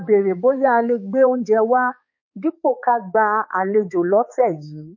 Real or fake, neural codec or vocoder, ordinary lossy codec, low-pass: fake; codec, 16 kHz, 4 kbps, X-Codec, HuBERT features, trained on balanced general audio; MP3, 32 kbps; 7.2 kHz